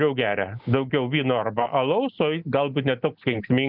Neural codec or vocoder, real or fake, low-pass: none; real; 5.4 kHz